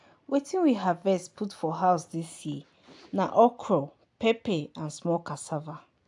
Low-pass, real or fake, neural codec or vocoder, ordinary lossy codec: 10.8 kHz; real; none; AAC, 64 kbps